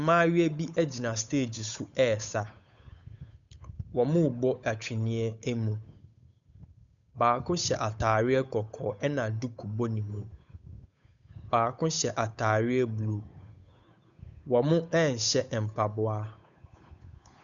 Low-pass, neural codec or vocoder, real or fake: 7.2 kHz; codec, 16 kHz, 8 kbps, FunCodec, trained on Chinese and English, 25 frames a second; fake